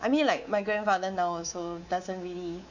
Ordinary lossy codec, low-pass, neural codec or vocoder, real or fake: none; 7.2 kHz; codec, 24 kHz, 3.1 kbps, DualCodec; fake